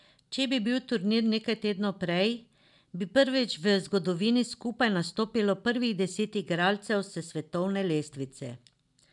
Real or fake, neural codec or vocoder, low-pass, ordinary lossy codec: real; none; 10.8 kHz; none